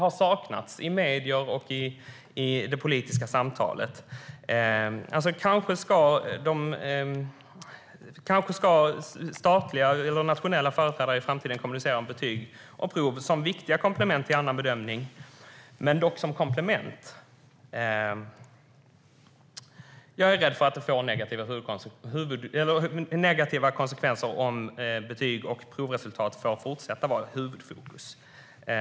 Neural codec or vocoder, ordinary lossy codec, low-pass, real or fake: none; none; none; real